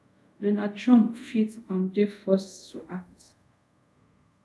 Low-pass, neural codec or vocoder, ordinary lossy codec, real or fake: none; codec, 24 kHz, 0.5 kbps, DualCodec; none; fake